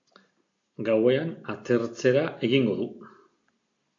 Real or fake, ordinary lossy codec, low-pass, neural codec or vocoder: real; AAC, 48 kbps; 7.2 kHz; none